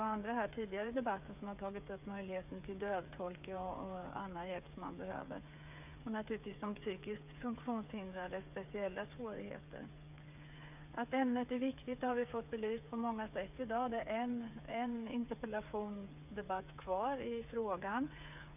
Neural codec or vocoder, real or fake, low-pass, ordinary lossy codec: codec, 16 kHz, 8 kbps, FreqCodec, smaller model; fake; 3.6 kHz; none